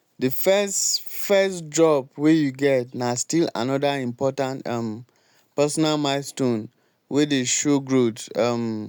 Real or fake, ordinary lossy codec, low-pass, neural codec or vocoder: real; none; none; none